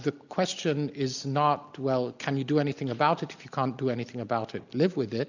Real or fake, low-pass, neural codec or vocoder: real; 7.2 kHz; none